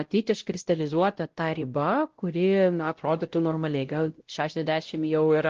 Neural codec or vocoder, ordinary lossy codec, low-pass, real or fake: codec, 16 kHz, 0.5 kbps, X-Codec, WavLM features, trained on Multilingual LibriSpeech; Opus, 16 kbps; 7.2 kHz; fake